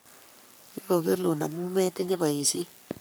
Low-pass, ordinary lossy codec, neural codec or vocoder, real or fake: none; none; codec, 44.1 kHz, 3.4 kbps, Pupu-Codec; fake